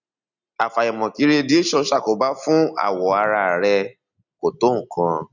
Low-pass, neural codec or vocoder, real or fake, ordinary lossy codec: 7.2 kHz; none; real; none